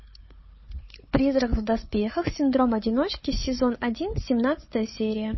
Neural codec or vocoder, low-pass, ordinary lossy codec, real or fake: codec, 16 kHz, 4 kbps, FunCodec, trained on Chinese and English, 50 frames a second; 7.2 kHz; MP3, 24 kbps; fake